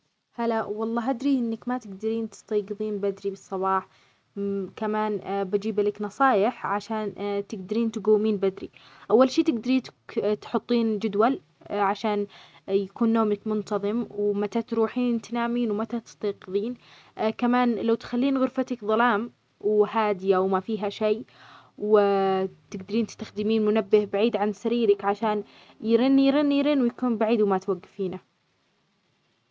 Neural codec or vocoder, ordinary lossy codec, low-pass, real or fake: none; none; none; real